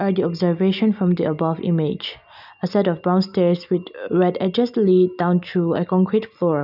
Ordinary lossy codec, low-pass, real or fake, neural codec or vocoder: none; 5.4 kHz; real; none